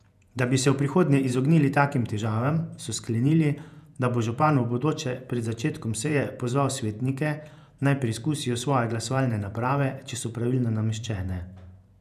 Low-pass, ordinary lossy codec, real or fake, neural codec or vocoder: 14.4 kHz; none; fake; vocoder, 48 kHz, 128 mel bands, Vocos